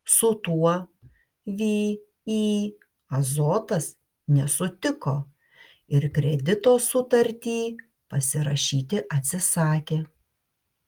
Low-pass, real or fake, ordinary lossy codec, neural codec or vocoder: 19.8 kHz; real; Opus, 24 kbps; none